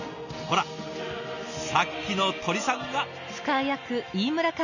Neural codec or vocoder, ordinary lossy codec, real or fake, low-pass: none; none; real; 7.2 kHz